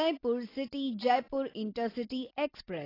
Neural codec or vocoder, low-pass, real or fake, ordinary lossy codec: none; 5.4 kHz; real; AAC, 24 kbps